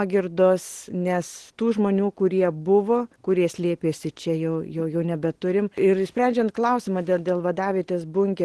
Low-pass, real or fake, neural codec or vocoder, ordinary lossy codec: 10.8 kHz; real; none; Opus, 16 kbps